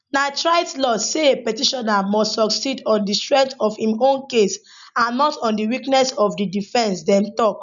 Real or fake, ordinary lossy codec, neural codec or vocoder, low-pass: real; none; none; 7.2 kHz